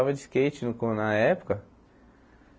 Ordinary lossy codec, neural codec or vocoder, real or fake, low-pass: none; none; real; none